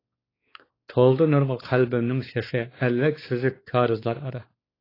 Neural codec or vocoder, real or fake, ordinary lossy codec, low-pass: codec, 16 kHz, 4 kbps, X-Codec, WavLM features, trained on Multilingual LibriSpeech; fake; AAC, 24 kbps; 5.4 kHz